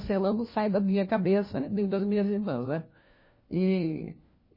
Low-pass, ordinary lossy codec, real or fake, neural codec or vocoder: 5.4 kHz; MP3, 24 kbps; fake; codec, 16 kHz, 1 kbps, FunCodec, trained on LibriTTS, 50 frames a second